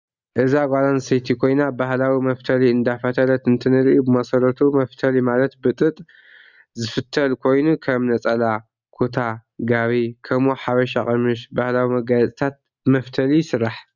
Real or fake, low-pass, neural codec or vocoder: real; 7.2 kHz; none